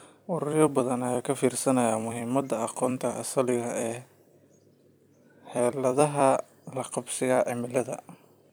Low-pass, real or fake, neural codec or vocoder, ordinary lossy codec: none; fake; vocoder, 44.1 kHz, 128 mel bands every 256 samples, BigVGAN v2; none